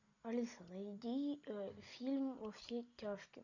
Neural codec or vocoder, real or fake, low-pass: none; real; 7.2 kHz